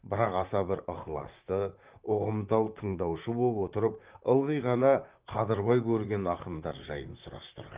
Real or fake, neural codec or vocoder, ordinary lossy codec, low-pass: fake; vocoder, 44.1 kHz, 128 mel bands, Pupu-Vocoder; Opus, 24 kbps; 3.6 kHz